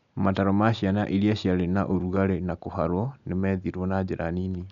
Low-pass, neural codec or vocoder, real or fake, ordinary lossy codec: 7.2 kHz; none; real; none